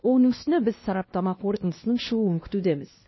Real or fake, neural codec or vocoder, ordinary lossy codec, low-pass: fake; codec, 16 kHz, 1 kbps, X-Codec, WavLM features, trained on Multilingual LibriSpeech; MP3, 24 kbps; 7.2 kHz